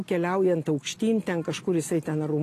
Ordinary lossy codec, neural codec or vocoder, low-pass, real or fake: AAC, 48 kbps; none; 14.4 kHz; real